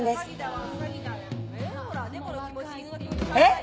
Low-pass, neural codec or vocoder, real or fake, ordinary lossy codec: none; none; real; none